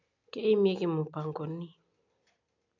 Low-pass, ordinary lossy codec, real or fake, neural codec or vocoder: 7.2 kHz; AAC, 48 kbps; real; none